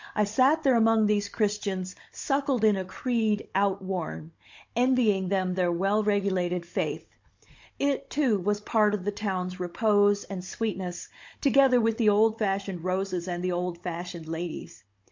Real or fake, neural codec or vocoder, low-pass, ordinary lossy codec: fake; codec, 16 kHz, 16 kbps, FunCodec, trained on Chinese and English, 50 frames a second; 7.2 kHz; MP3, 48 kbps